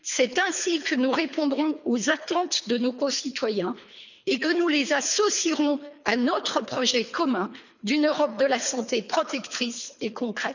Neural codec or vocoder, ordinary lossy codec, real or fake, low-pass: codec, 24 kHz, 3 kbps, HILCodec; none; fake; 7.2 kHz